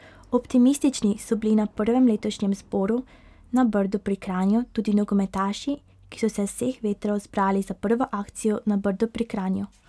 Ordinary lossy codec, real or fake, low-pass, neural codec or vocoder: none; real; none; none